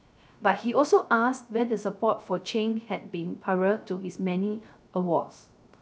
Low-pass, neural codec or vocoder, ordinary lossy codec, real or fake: none; codec, 16 kHz, 0.3 kbps, FocalCodec; none; fake